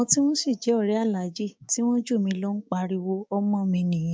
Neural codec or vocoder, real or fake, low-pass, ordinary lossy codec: codec, 16 kHz, 6 kbps, DAC; fake; none; none